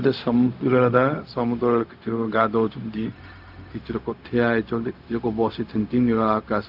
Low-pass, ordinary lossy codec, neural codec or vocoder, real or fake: 5.4 kHz; Opus, 24 kbps; codec, 16 kHz, 0.4 kbps, LongCat-Audio-Codec; fake